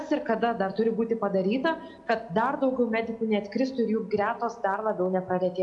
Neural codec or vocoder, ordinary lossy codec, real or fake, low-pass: none; AAC, 64 kbps; real; 10.8 kHz